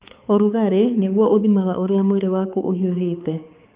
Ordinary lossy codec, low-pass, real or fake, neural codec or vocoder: Opus, 24 kbps; 3.6 kHz; fake; codec, 16 kHz, 4 kbps, X-Codec, HuBERT features, trained on balanced general audio